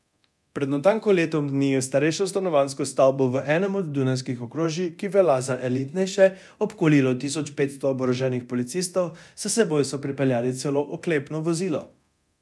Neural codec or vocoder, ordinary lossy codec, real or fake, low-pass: codec, 24 kHz, 0.9 kbps, DualCodec; none; fake; none